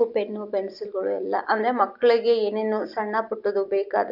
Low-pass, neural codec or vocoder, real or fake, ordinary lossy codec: 5.4 kHz; none; real; none